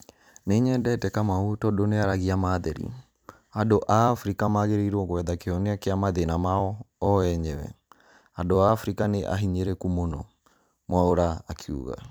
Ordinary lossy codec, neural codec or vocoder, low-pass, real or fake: none; vocoder, 44.1 kHz, 128 mel bands every 256 samples, BigVGAN v2; none; fake